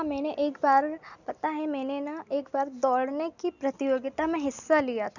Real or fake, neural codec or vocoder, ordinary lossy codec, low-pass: real; none; none; 7.2 kHz